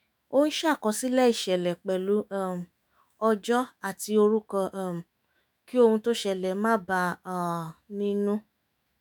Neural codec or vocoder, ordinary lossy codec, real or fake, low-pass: autoencoder, 48 kHz, 128 numbers a frame, DAC-VAE, trained on Japanese speech; none; fake; none